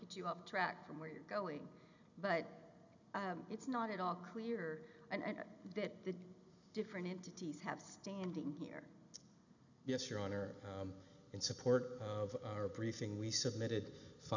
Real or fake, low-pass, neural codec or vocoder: real; 7.2 kHz; none